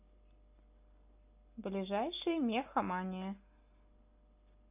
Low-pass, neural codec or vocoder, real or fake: 3.6 kHz; none; real